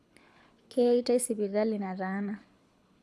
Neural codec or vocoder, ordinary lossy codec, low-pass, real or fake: codec, 24 kHz, 6 kbps, HILCodec; none; none; fake